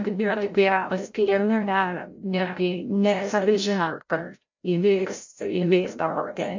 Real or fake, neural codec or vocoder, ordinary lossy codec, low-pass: fake; codec, 16 kHz, 0.5 kbps, FreqCodec, larger model; MP3, 64 kbps; 7.2 kHz